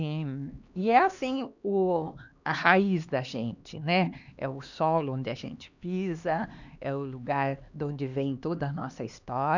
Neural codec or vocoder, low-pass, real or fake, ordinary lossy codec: codec, 16 kHz, 2 kbps, X-Codec, HuBERT features, trained on LibriSpeech; 7.2 kHz; fake; none